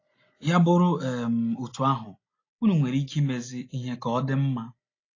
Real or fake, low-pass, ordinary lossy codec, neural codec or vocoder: real; 7.2 kHz; AAC, 32 kbps; none